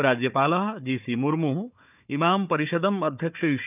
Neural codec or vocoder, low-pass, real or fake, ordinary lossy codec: codec, 16 kHz, 4 kbps, FunCodec, trained on Chinese and English, 50 frames a second; 3.6 kHz; fake; none